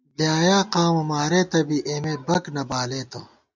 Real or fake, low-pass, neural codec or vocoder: real; 7.2 kHz; none